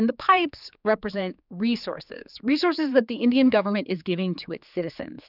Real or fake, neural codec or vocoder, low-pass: fake; codec, 16 kHz, 4 kbps, X-Codec, HuBERT features, trained on general audio; 5.4 kHz